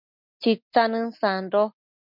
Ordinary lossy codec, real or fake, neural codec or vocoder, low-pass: MP3, 48 kbps; real; none; 5.4 kHz